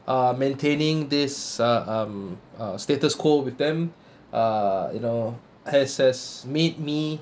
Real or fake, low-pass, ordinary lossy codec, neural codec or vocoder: real; none; none; none